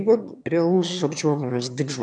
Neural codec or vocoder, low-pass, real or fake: autoencoder, 22.05 kHz, a latent of 192 numbers a frame, VITS, trained on one speaker; 9.9 kHz; fake